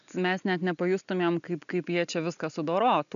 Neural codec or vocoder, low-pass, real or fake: none; 7.2 kHz; real